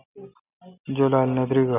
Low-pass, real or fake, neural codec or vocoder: 3.6 kHz; real; none